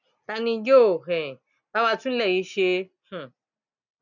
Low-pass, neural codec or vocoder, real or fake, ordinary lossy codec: 7.2 kHz; none; real; none